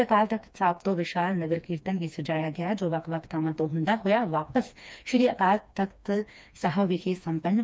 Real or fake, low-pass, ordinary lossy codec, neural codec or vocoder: fake; none; none; codec, 16 kHz, 2 kbps, FreqCodec, smaller model